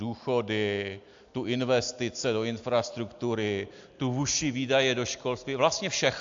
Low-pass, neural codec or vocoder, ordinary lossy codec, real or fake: 7.2 kHz; none; AAC, 64 kbps; real